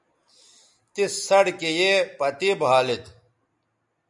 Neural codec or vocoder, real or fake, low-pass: none; real; 10.8 kHz